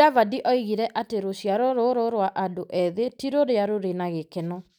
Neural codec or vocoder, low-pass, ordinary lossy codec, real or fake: none; 19.8 kHz; none; real